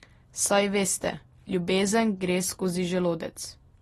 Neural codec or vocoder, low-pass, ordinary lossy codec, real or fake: none; 19.8 kHz; AAC, 32 kbps; real